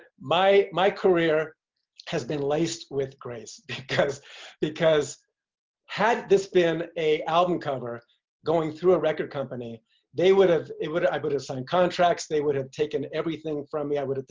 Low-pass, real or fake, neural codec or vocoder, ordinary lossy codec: 7.2 kHz; real; none; Opus, 24 kbps